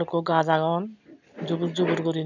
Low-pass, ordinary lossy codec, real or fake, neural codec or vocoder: 7.2 kHz; none; real; none